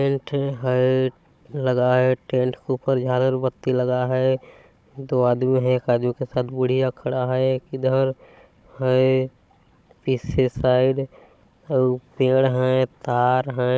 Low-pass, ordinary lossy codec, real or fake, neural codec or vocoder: none; none; fake; codec, 16 kHz, 16 kbps, FunCodec, trained on Chinese and English, 50 frames a second